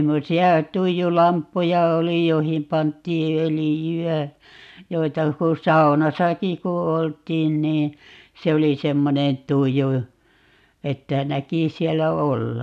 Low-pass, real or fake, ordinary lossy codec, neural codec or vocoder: 14.4 kHz; real; none; none